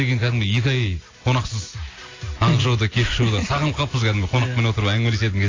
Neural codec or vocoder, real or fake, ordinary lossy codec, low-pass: none; real; AAC, 32 kbps; 7.2 kHz